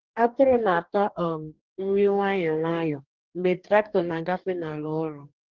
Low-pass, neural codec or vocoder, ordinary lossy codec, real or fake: 7.2 kHz; codec, 44.1 kHz, 2.6 kbps, DAC; Opus, 16 kbps; fake